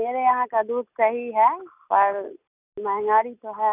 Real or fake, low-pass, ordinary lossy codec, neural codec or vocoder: real; 3.6 kHz; none; none